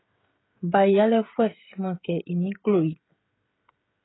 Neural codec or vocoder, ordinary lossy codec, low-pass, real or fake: codec, 16 kHz, 16 kbps, FreqCodec, smaller model; AAC, 16 kbps; 7.2 kHz; fake